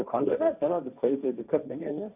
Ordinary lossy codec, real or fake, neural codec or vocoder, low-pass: none; fake; codec, 16 kHz, 1.1 kbps, Voila-Tokenizer; 3.6 kHz